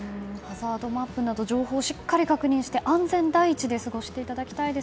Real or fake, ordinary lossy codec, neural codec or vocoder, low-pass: real; none; none; none